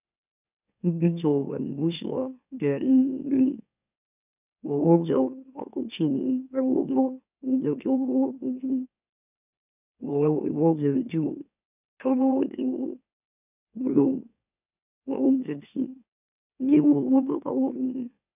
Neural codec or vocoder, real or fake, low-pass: autoencoder, 44.1 kHz, a latent of 192 numbers a frame, MeloTTS; fake; 3.6 kHz